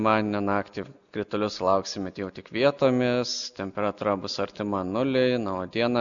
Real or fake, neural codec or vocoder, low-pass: real; none; 7.2 kHz